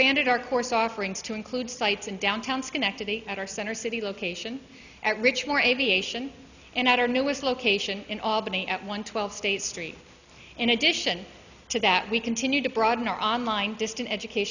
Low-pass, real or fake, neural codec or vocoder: 7.2 kHz; real; none